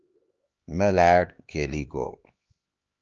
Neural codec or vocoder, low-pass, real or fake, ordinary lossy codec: codec, 16 kHz, 2 kbps, X-Codec, HuBERT features, trained on LibriSpeech; 7.2 kHz; fake; Opus, 24 kbps